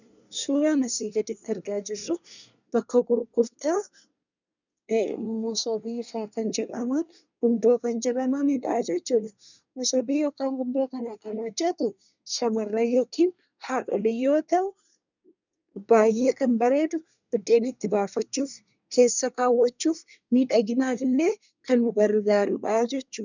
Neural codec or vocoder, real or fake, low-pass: codec, 24 kHz, 1 kbps, SNAC; fake; 7.2 kHz